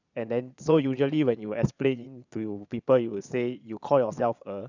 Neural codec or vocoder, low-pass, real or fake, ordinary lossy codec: vocoder, 22.05 kHz, 80 mel bands, Vocos; 7.2 kHz; fake; none